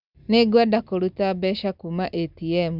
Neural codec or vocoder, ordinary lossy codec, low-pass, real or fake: none; none; 5.4 kHz; real